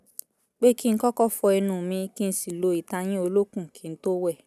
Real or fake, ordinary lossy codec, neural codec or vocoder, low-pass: real; none; none; 14.4 kHz